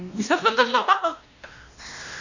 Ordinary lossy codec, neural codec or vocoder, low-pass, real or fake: none; codec, 16 kHz, 1 kbps, X-Codec, WavLM features, trained on Multilingual LibriSpeech; 7.2 kHz; fake